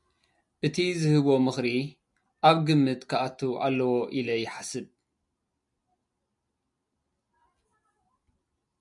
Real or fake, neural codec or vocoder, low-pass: real; none; 10.8 kHz